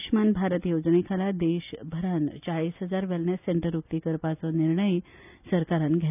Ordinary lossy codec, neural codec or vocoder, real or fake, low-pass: none; none; real; 3.6 kHz